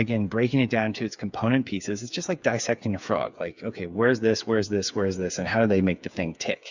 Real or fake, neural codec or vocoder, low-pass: fake; codec, 16 kHz, 8 kbps, FreqCodec, smaller model; 7.2 kHz